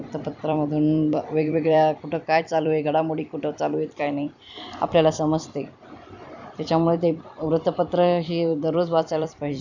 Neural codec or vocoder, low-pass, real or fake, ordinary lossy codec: vocoder, 44.1 kHz, 128 mel bands every 256 samples, BigVGAN v2; 7.2 kHz; fake; none